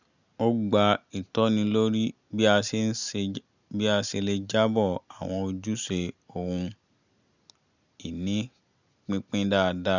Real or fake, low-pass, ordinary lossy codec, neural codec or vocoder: real; 7.2 kHz; none; none